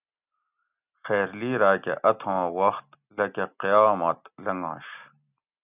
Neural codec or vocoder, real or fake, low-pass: none; real; 3.6 kHz